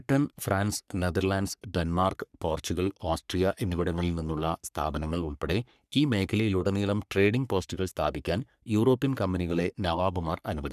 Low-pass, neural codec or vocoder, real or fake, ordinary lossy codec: 14.4 kHz; codec, 44.1 kHz, 3.4 kbps, Pupu-Codec; fake; none